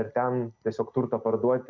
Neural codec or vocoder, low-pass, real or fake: none; 7.2 kHz; real